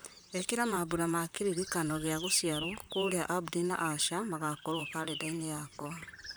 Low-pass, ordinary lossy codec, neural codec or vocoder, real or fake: none; none; vocoder, 44.1 kHz, 128 mel bands, Pupu-Vocoder; fake